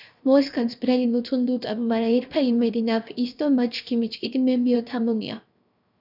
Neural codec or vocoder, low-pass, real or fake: codec, 16 kHz, 0.7 kbps, FocalCodec; 5.4 kHz; fake